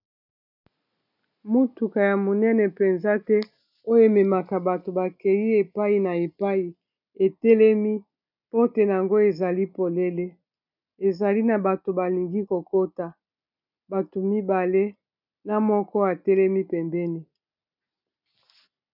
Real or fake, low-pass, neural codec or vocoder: real; 5.4 kHz; none